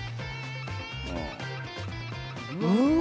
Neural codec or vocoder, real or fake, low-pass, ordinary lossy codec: none; real; none; none